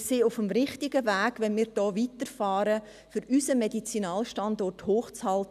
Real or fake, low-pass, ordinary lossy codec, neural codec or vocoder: real; 14.4 kHz; none; none